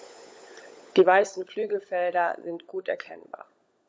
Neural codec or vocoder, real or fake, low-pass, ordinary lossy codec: codec, 16 kHz, 16 kbps, FunCodec, trained on LibriTTS, 50 frames a second; fake; none; none